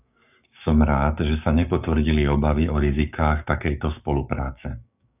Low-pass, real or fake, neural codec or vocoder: 3.6 kHz; fake; codec, 16 kHz, 6 kbps, DAC